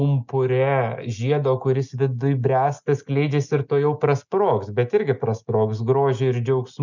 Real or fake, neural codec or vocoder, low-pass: real; none; 7.2 kHz